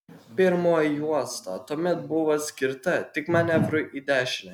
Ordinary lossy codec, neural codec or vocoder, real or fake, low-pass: MP3, 96 kbps; none; real; 19.8 kHz